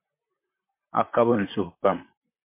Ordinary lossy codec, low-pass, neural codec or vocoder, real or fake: MP3, 24 kbps; 3.6 kHz; vocoder, 44.1 kHz, 128 mel bands, Pupu-Vocoder; fake